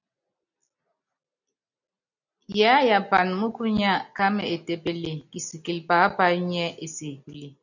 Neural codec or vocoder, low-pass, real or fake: none; 7.2 kHz; real